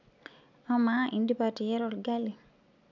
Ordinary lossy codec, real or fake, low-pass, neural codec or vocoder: none; real; 7.2 kHz; none